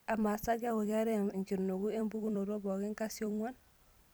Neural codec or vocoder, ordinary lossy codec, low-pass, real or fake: vocoder, 44.1 kHz, 128 mel bands every 256 samples, BigVGAN v2; none; none; fake